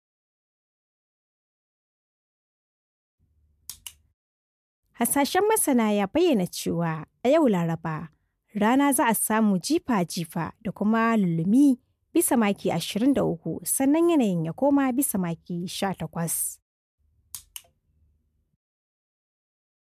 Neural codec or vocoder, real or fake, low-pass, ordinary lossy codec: none; real; 14.4 kHz; MP3, 96 kbps